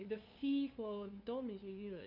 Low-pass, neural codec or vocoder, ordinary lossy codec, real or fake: 5.4 kHz; codec, 16 kHz, 2 kbps, FunCodec, trained on LibriTTS, 25 frames a second; none; fake